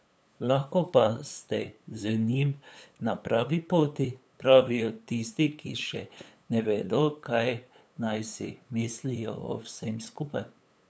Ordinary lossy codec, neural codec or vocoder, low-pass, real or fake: none; codec, 16 kHz, 8 kbps, FunCodec, trained on LibriTTS, 25 frames a second; none; fake